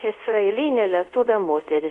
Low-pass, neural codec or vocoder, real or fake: 10.8 kHz; codec, 24 kHz, 0.5 kbps, DualCodec; fake